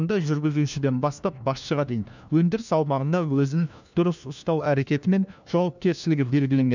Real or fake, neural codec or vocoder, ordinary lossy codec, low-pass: fake; codec, 16 kHz, 1 kbps, FunCodec, trained on LibriTTS, 50 frames a second; none; 7.2 kHz